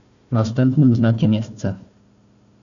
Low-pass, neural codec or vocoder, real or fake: 7.2 kHz; codec, 16 kHz, 1 kbps, FunCodec, trained on Chinese and English, 50 frames a second; fake